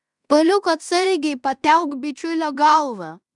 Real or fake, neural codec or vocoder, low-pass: fake; codec, 16 kHz in and 24 kHz out, 0.9 kbps, LongCat-Audio-Codec, fine tuned four codebook decoder; 10.8 kHz